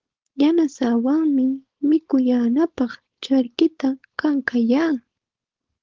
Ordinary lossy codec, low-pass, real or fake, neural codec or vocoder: Opus, 16 kbps; 7.2 kHz; fake; codec, 16 kHz, 4.8 kbps, FACodec